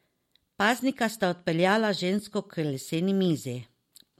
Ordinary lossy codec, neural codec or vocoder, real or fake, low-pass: MP3, 64 kbps; none; real; 19.8 kHz